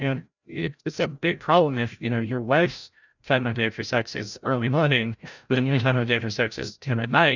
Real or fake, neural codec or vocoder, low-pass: fake; codec, 16 kHz, 0.5 kbps, FreqCodec, larger model; 7.2 kHz